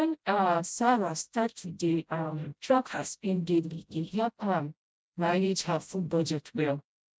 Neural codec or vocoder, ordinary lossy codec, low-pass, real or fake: codec, 16 kHz, 0.5 kbps, FreqCodec, smaller model; none; none; fake